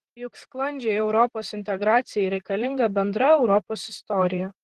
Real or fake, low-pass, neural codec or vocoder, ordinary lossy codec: fake; 14.4 kHz; vocoder, 44.1 kHz, 128 mel bands, Pupu-Vocoder; Opus, 16 kbps